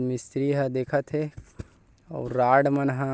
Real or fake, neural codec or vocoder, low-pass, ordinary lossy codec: real; none; none; none